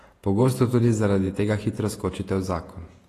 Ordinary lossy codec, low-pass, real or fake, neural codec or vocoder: AAC, 48 kbps; 14.4 kHz; fake; vocoder, 48 kHz, 128 mel bands, Vocos